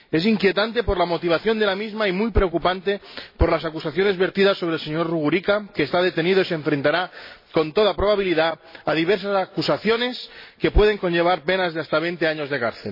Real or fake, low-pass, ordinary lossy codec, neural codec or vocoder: real; 5.4 kHz; MP3, 24 kbps; none